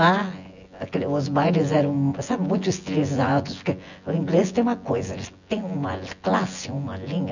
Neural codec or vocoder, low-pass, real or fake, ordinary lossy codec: vocoder, 24 kHz, 100 mel bands, Vocos; 7.2 kHz; fake; none